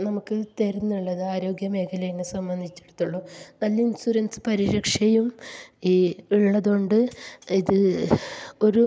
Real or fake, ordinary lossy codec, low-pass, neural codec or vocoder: real; none; none; none